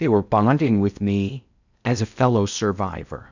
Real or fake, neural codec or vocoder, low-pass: fake; codec, 16 kHz in and 24 kHz out, 0.6 kbps, FocalCodec, streaming, 4096 codes; 7.2 kHz